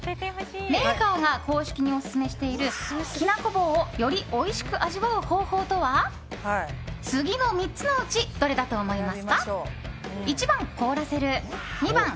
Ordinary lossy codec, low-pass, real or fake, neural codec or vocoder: none; none; real; none